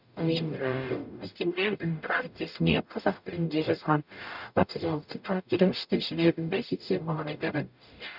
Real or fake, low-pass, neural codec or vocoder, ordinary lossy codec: fake; 5.4 kHz; codec, 44.1 kHz, 0.9 kbps, DAC; none